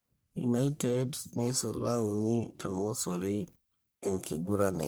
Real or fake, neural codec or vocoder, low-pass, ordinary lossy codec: fake; codec, 44.1 kHz, 1.7 kbps, Pupu-Codec; none; none